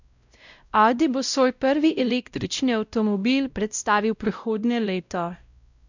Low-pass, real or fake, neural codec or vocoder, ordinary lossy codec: 7.2 kHz; fake; codec, 16 kHz, 0.5 kbps, X-Codec, WavLM features, trained on Multilingual LibriSpeech; none